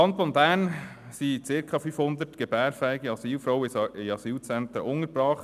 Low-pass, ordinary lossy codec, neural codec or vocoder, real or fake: 14.4 kHz; none; none; real